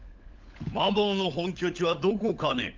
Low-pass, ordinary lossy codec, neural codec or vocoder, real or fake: 7.2 kHz; Opus, 24 kbps; codec, 16 kHz, 16 kbps, FunCodec, trained on LibriTTS, 50 frames a second; fake